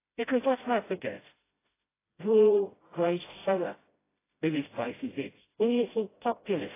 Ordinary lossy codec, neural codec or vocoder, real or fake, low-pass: AAC, 16 kbps; codec, 16 kHz, 0.5 kbps, FreqCodec, smaller model; fake; 3.6 kHz